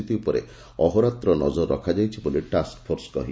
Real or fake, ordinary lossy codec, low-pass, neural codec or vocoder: real; none; none; none